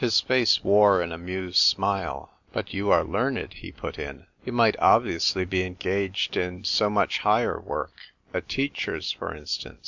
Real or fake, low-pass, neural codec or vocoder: real; 7.2 kHz; none